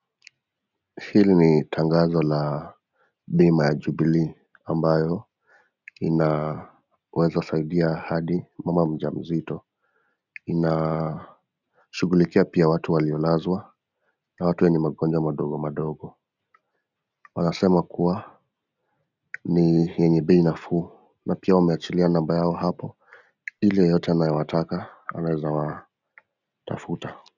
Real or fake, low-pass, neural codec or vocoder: real; 7.2 kHz; none